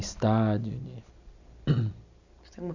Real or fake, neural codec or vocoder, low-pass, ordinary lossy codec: real; none; 7.2 kHz; none